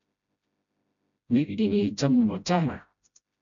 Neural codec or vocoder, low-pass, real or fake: codec, 16 kHz, 0.5 kbps, FreqCodec, smaller model; 7.2 kHz; fake